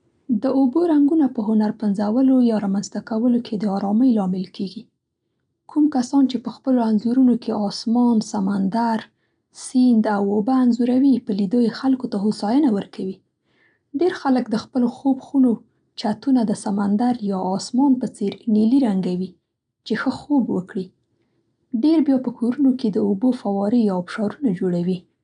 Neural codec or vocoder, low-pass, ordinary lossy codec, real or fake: none; 9.9 kHz; none; real